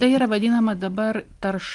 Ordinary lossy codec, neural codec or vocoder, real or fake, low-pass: Opus, 24 kbps; none; real; 10.8 kHz